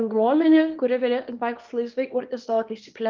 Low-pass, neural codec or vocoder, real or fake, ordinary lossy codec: 7.2 kHz; codec, 24 kHz, 0.9 kbps, WavTokenizer, small release; fake; Opus, 24 kbps